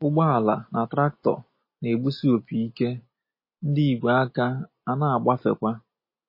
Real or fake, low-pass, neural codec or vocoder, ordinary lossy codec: real; 5.4 kHz; none; MP3, 24 kbps